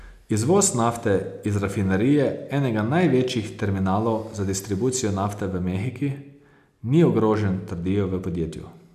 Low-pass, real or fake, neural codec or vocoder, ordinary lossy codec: 14.4 kHz; real; none; none